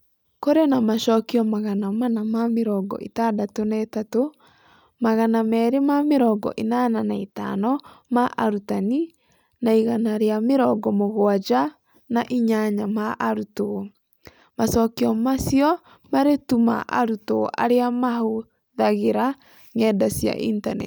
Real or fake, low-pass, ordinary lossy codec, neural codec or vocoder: real; none; none; none